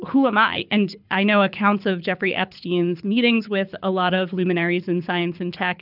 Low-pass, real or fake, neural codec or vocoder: 5.4 kHz; fake; codec, 24 kHz, 6 kbps, HILCodec